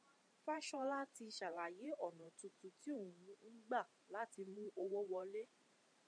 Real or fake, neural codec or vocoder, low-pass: fake; vocoder, 44.1 kHz, 128 mel bands every 512 samples, BigVGAN v2; 9.9 kHz